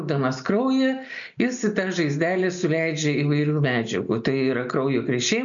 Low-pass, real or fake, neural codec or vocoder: 7.2 kHz; real; none